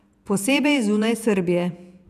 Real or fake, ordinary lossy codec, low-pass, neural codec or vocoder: fake; none; 14.4 kHz; vocoder, 48 kHz, 128 mel bands, Vocos